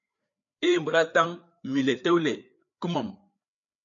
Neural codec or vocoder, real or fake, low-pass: codec, 16 kHz, 4 kbps, FreqCodec, larger model; fake; 7.2 kHz